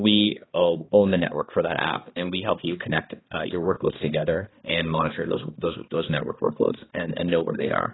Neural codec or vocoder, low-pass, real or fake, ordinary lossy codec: codec, 16 kHz, 2 kbps, X-Codec, HuBERT features, trained on balanced general audio; 7.2 kHz; fake; AAC, 16 kbps